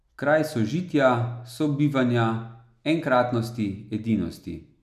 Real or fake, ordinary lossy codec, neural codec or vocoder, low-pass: real; none; none; 14.4 kHz